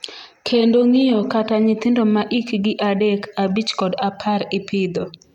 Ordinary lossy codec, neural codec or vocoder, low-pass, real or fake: none; none; 19.8 kHz; real